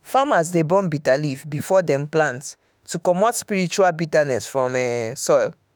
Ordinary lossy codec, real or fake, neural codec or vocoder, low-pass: none; fake; autoencoder, 48 kHz, 32 numbers a frame, DAC-VAE, trained on Japanese speech; none